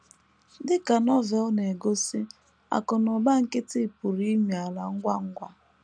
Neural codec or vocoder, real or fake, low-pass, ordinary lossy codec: none; real; 9.9 kHz; none